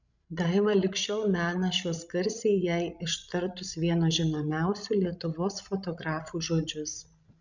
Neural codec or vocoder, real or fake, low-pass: codec, 16 kHz, 16 kbps, FreqCodec, larger model; fake; 7.2 kHz